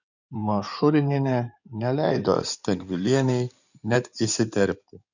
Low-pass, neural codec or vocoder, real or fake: 7.2 kHz; codec, 16 kHz in and 24 kHz out, 2.2 kbps, FireRedTTS-2 codec; fake